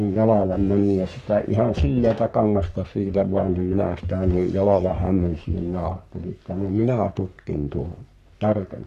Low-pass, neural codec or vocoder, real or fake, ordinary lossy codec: 14.4 kHz; codec, 44.1 kHz, 3.4 kbps, Pupu-Codec; fake; none